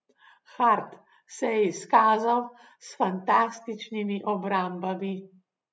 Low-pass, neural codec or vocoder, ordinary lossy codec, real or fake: none; none; none; real